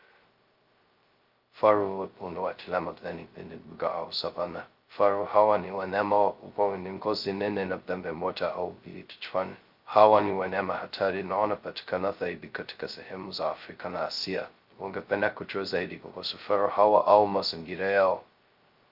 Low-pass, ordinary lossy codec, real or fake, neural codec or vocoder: 5.4 kHz; Opus, 32 kbps; fake; codec, 16 kHz, 0.2 kbps, FocalCodec